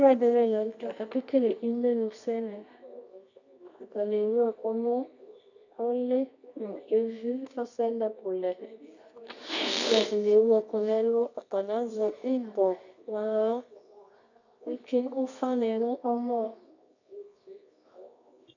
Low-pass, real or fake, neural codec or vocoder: 7.2 kHz; fake; codec, 24 kHz, 0.9 kbps, WavTokenizer, medium music audio release